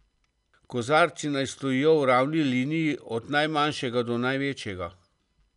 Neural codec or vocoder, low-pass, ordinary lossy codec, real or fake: none; 10.8 kHz; none; real